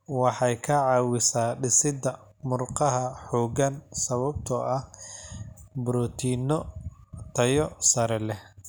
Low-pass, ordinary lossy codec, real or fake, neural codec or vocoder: none; none; real; none